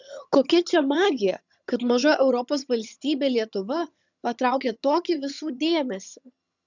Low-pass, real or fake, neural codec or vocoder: 7.2 kHz; fake; vocoder, 22.05 kHz, 80 mel bands, HiFi-GAN